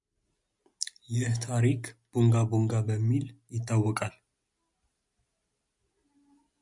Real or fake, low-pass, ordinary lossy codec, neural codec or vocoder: real; 10.8 kHz; MP3, 96 kbps; none